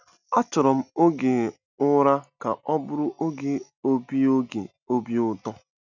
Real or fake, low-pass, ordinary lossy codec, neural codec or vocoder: real; 7.2 kHz; none; none